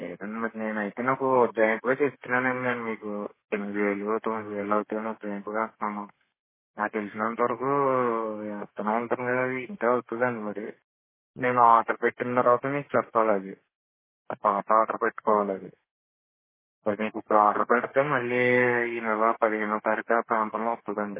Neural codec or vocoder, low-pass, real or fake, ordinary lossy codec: codec, 32 kHz, 1.9 kbps, SNAC; 3.6 kHz; fake; MP3, 16 kbps